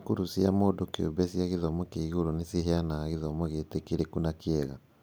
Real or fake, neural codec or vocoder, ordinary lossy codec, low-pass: real; none; none; none